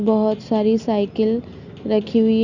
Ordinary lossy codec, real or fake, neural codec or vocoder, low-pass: Opus, 64 kbps; real; none; 7.2 kHz